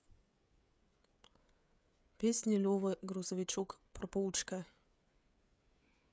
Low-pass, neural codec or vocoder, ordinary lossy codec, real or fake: none; codec, 16 kHz, 16 kbps, FreqCodec, smaller model; none; fake